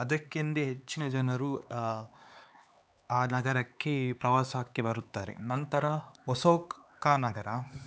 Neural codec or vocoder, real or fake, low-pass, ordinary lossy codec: codec, 16 kHz, 4 kbps, X-Codec, HuBERT features, trained on LibriSpeech; fake; none; none